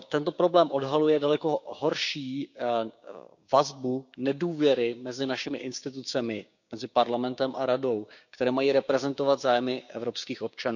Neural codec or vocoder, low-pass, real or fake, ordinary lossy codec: codec, 16 kHz, 6 kbps, DAC; 7.2 kHz; fake; none